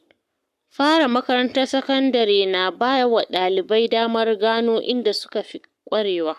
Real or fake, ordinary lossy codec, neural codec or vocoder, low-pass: fake; none; codec, 44.1 kHz, 7.8 kbps, Pupu-Codec; 14.4 kHz